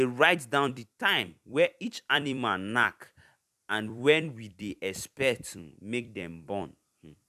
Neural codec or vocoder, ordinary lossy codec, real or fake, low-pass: vocoder, 44.1 kHz, 128 mel bands every 256 samples, BigVGAN v2; none; fake; 14.4 kHz